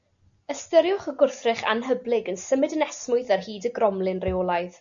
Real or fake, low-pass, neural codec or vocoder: real; 7.2 kHz; none